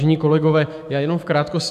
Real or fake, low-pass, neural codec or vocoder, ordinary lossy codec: fake; 14.4 kHz; autoencoder, 48 kHz, 128 numbers a frame, DAC-VAE, trained on Japanese speech; AAC, 96 kbps